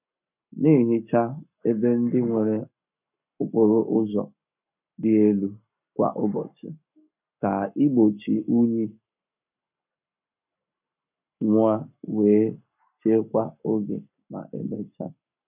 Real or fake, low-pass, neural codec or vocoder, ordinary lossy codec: fake; 3.6 kHz; codec, 44.1 kHz, 7.8 kbps, Pupu-Codec; none